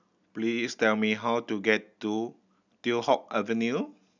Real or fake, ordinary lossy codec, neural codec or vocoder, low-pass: real; none; none; 7.2 kHz